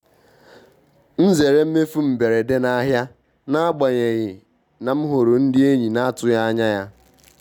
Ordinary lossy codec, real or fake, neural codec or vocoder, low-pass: none; real; none; 19.8 kHz